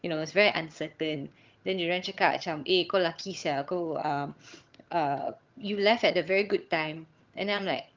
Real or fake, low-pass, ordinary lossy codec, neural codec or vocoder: fake; 7.2 kHz; Opus, 24 kbps; vocoder, 22.05 kHz, 80 mel bands, HiFi-GAN